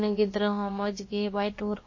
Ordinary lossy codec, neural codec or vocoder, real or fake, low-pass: MP3, 32 kbps; codec, 16 kHz, about 1 kbps, DyCAST, with the encoder's durations; fake; 7.2 kHz